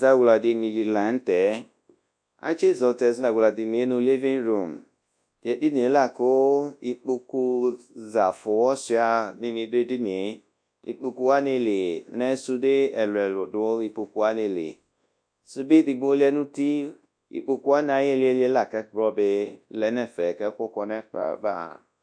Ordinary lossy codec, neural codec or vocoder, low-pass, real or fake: AAC, 64 kbps; codec, 24 kHz, 0.9 kbps, WavTokenizer, large speech release; 9.9 kHz; fake